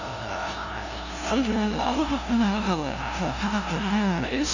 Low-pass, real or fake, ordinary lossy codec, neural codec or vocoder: 7.2 kHz; fake; none; codec, 16 kHz, 0.5 kbps, FunCodec, trained on LibriTTS, 25 frames a second